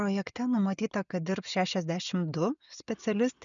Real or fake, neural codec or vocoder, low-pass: real; none; 7.2 kHz